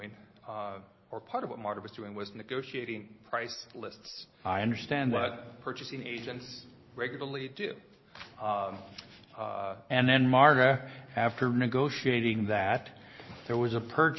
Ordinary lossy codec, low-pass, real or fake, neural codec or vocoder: MP3, 24 kbps; 7.2 kHz; real; none